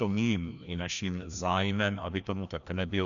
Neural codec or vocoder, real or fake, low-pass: codec, 16 kHz, 1 kbps, FreqCodec, larger model; fake; 7.2 kHz